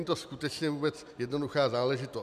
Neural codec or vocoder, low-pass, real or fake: none; 14.4 kHz; real